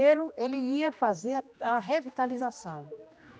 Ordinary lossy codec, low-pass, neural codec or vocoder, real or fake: none; none; codec, 16 kHz, 1 kbps, X-Codec, HuBERT features, trained on general audio; fake